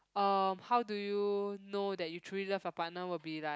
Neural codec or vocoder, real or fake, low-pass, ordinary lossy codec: none; real; none; none